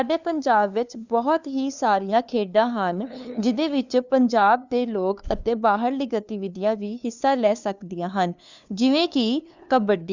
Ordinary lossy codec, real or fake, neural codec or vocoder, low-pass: Opus, 64 kbps; fake; codec, 16 kHz, 2 kbps, FunCodec, trained on LibriTTS, 25 frames a second; 7.2 kHz